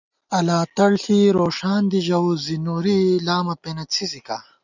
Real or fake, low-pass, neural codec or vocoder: real; 7.2 kHz; none